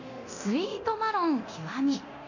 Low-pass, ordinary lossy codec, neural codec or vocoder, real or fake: 7.2 kHz; none; codec, 24 kHz, 0.9 kbps, DualCodec; fake